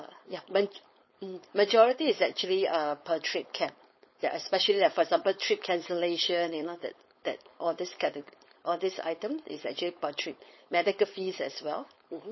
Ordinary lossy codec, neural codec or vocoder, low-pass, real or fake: MP3, 24 kbps; codec, 16 kHz, 4.8 kbps, FACodec; 7.2 kHz; fake